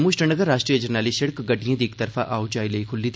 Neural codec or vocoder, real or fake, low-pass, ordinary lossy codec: none; real; none; none